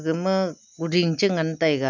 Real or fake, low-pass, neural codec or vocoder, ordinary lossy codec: real; 7.2 kHz; none; MP3, 64 kbps